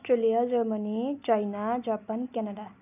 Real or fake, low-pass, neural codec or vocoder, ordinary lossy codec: real; 3.6 kHz; none; none